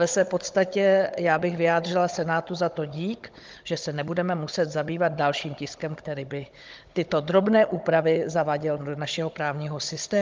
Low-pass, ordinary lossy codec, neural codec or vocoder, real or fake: 7.2 kHz; Opus, 24 kbps; codec, 16 kHz, 16 kbps, FunCodec, trained on Chinese and English, 50 frames a second; fake